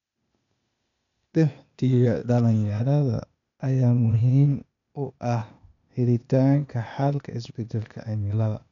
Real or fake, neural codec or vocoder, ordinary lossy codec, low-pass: fake; codec, 16 kHz, 0.8 kbps, ZipCodec; none; 7.2 kHz